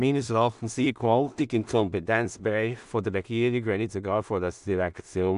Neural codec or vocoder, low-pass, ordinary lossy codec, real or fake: codec, 16 kHz in and 24 kHz out, 0.4 kbps, LongCat-Audio-Codec, two codebook decoder; 10.8 kHz; none; fake